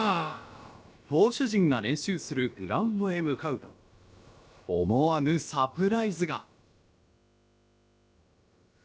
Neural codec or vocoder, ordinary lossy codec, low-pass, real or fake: codec, 16 kHz, about 1 kbps, DyCAST, with the encoder's durations; none; none; fake